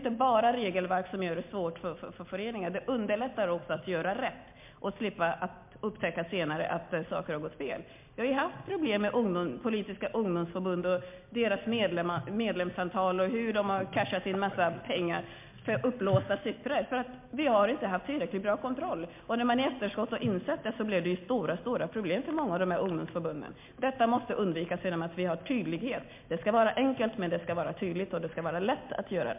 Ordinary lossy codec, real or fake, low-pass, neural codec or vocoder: MP3, 32 kbps; real; 3.6 kHz; none